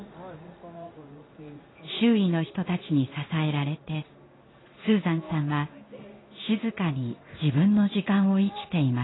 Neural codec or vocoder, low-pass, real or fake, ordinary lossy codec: codec, 16 kHz in and 24 kHz out, 1 kbps, XY-Tokenizer; 7.2 kHz; fake; AAC, 16 kbps